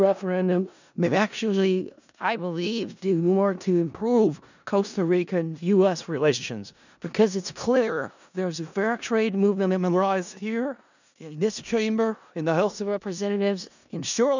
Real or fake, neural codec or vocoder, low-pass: fake; codec, 16 kHz in and 24 kHz out, 0.4 kbps, LongCat-Audio-Codec, four codebook decoder; 7.2 kHz